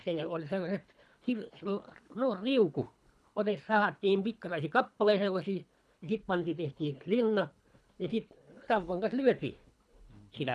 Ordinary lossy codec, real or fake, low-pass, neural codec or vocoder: none; fake; none; codec, 24 kHz, 3 kbps, HILCodec